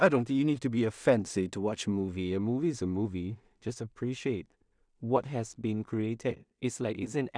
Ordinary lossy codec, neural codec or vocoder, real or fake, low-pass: none; codec, 16 kHz in and 24 kHz out, 0.4 kbps, LongCat-Audio-Codec, two codebook decoder; fake; 9.9 kHz